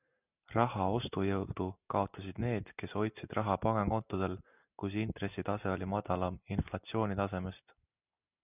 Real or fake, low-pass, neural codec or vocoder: real; 3.6 kHz; none